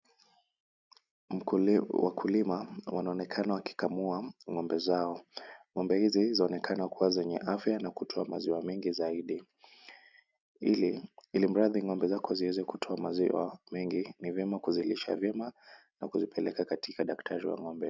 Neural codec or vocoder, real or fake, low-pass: none; real; 7.2 kHz